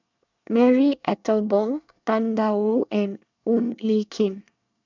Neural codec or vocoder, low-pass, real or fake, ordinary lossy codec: codec, 24 kHz, 1 kbps, SNAC; 7.2 kHz; fake; none